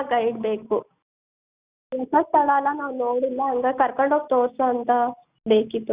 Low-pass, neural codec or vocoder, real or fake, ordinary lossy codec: 3.6 kHz; none; real; Opus, 64 kbps